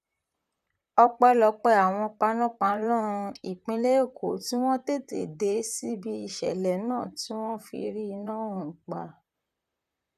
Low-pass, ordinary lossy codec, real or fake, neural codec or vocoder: 14.4 kHz; none; fake; vocoder, 44.1 kHz, 128 mel bands, Pupu-Vocoder